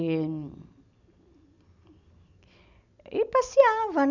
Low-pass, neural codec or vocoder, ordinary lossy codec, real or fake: 7.2 kHz; none; none; real